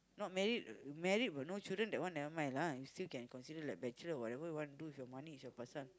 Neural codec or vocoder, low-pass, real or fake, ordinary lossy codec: none; none; real; none